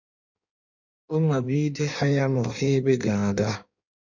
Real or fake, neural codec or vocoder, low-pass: fake; codec, 16 kHz in and 24 kHz out, 1.1 kbps, FireRedTTS-2 codec; 7.2 kHz